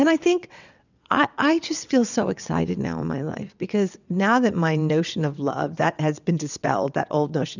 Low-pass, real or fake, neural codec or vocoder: 7.2 kHz; fake; vocoder, 22.05 kHz, 80 mel bands, WaveNeXt